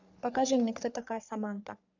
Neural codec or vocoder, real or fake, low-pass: codec, 44.1 kHz, 3.4 kbps, Pupu-Codec; fake; 7.2 kHz